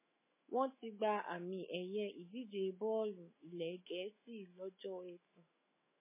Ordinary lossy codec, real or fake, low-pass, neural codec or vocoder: MP3, 16 kbps; fake; 3.6 kHz; autoencoder, 48 kHz, 128 numbers a frame, DAC-VAE, trained on Japanese speech